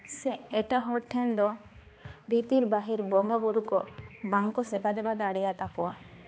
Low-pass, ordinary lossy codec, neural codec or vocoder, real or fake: none; none; codec, 16 kHz, 2 kbps, X-Codec, HuBERT features, trained on balanced general audio; fake